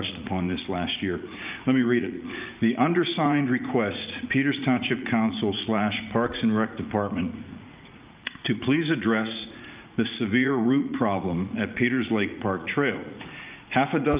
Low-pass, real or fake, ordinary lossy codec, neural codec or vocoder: 3.6 kHz; fake; Opus, 24 kbps; vocoder, 44.1 kHz, 80 mel bands, Vocos